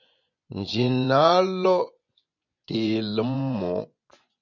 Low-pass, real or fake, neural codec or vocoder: 7.2 kHz; fake; vocoder, 24 kHz, 100 mel bands, Vocos